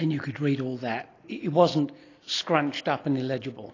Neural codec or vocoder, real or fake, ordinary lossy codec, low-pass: none; real; AAC, 32 kbps; 7.2 kHz